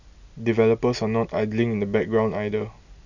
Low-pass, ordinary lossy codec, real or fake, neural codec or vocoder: 7.2 kHz; none; real; none